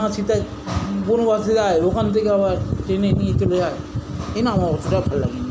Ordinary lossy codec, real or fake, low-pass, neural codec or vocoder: none; real; none; none